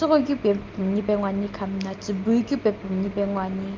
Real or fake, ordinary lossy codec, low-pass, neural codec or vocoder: real; Opus, 24 kbps; 7.2 kHz; none